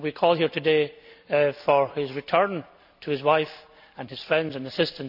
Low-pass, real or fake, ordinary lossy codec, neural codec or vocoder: 5.4 kHz; real; none; none